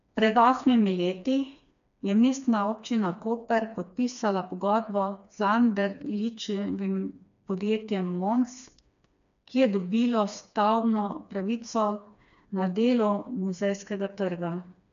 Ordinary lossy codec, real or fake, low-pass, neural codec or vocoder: none; fake; 7.2 kHz; codec, 16 kHz, 2 kbps, FreqCodec, smaller model